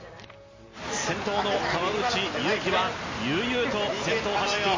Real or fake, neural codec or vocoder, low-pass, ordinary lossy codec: real; none; 7.2 kHz; MP3, 32 kbps